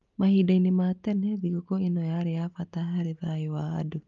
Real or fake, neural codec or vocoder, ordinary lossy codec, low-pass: real; none; Opus, 24 kbps; 7.2 kHz